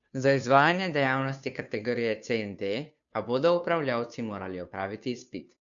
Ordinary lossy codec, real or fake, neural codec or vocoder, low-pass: none; fake; codec, 16 kHz, 2 kbps, FunCodec, trained on Chinese and English, 25 frames a second; 7.2 kHz